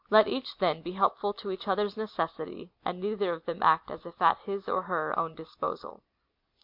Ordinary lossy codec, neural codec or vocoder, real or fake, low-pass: MP3, 48 kbps; none; real; 5.4 kHz